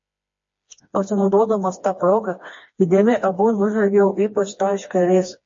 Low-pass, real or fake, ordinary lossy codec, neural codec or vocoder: 7.2 kHz; fake; MP3, 32 kbps; codec, 16 kHz, 2 kbps, FreqCodec, smaller model